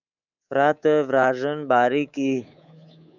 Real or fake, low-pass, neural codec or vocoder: fake; 7.2 kHz; codec, 24 kHz, 3.1 kbps, DualCodec